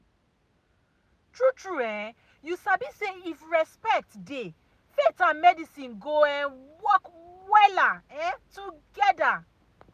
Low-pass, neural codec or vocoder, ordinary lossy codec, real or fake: 14.4 kHz; none; none; real